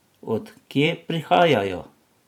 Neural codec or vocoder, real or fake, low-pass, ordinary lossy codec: vocoder, 44.1 kHz, 128 mel bands every 512 samples, BigVGAN v2; fake; 19.8 kHz; none